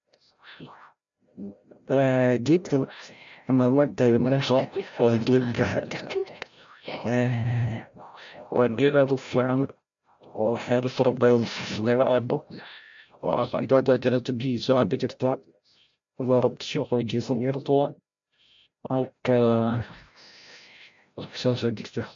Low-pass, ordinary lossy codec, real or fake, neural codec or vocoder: 7.2 kHz; MP3, 64 kbps; fake; codec, 16 kHz, 0.5 kbps, FreqCodec, larger model